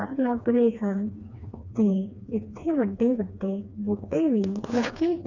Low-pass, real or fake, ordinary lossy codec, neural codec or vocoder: 7.2 kHz; fake; none; codec, 16 kHz, 2 kbps, FreqCodec, smaller model